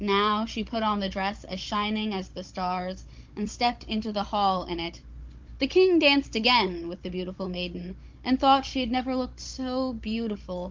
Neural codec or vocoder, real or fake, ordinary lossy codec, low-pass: codec, 16 kHz, 16 kbps, FunCodec, trained on Chinese and English, 50 frames a second; fake; Opus, 24 kbps; 7.2 kHz